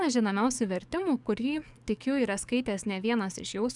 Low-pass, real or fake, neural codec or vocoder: 10.8 kHz; fake; codec, 44.1 kHz, 7.8 kbps, DAC